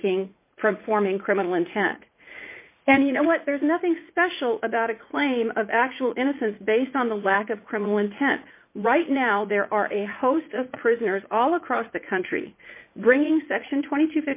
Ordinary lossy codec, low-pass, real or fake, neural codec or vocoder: MP3, 24 kbps; 3.6 kHz; fake; vocoder, 44.1 kHz, 80 mel bands, Vocos